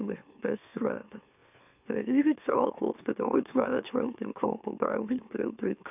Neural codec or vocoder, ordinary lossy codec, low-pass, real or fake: autoencoder, 44.1 kHz, a latent of 192 numbers a frame, MeloTTS; none; 3.6 kHz; fake